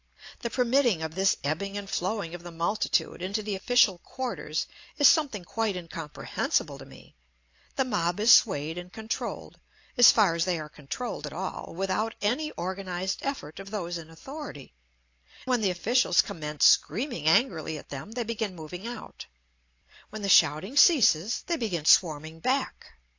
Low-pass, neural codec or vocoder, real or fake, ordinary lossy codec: 7.2 kHz; none; real; AAC, 48 kbps